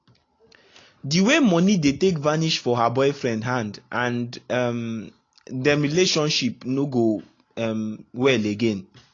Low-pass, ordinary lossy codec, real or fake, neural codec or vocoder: 7.2 kHz; AAC, 32 kbps; real; none